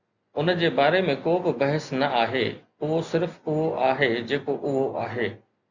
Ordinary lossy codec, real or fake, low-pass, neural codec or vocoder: Opus, 64 kbps; real; 7.2 kHz; none